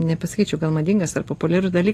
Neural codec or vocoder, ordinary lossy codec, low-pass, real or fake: none; AAC, 48 kbps; 14.4 kHz; real